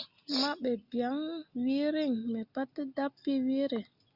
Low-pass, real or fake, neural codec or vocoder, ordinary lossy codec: 5.4 kHz; real; none; Opus, 64 kbps